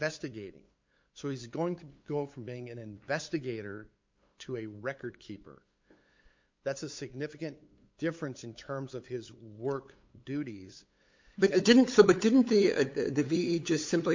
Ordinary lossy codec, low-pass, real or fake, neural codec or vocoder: MP3, 48 kbps; 7.2 kHz; fake; codec, 16 kHz, 8 kbps, FunCodec, trained on LibriTTS, 25 frames a second